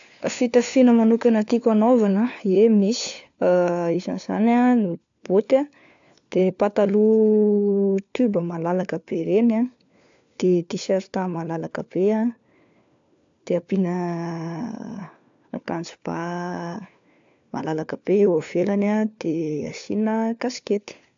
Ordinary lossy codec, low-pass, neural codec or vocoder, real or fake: AAC, 64 kbps; 7.2 kHz; codec, 16 kHz, 4 kbps, FunCodec, trained on LibriTTS, 50 frames a second; fake